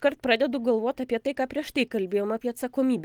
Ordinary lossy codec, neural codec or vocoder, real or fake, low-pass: Opus, 24 kbps; none; real; 19.8 kHz